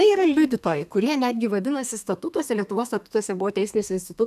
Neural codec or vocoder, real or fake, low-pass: codec, 32 kHz, 1.9 kbps, SNAC; fake; 14.4 kHz